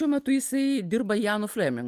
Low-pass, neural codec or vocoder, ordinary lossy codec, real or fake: 14.4 kHz; none; Opus, 32 kbps; real